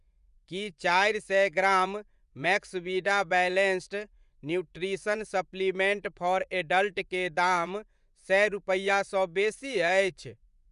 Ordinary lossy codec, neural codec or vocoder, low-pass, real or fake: none; vocoder, 24 kHz, 100 mel bands, Vocos; 10.8 kHz; fake